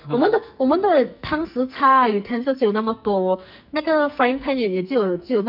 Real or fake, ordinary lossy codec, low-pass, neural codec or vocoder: fake; none; 5.4 kHz; codec, 44.1 kHz, 2.6 kbps, SNAC